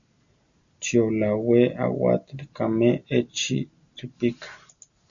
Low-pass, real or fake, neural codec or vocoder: 7.2 kHz; real; none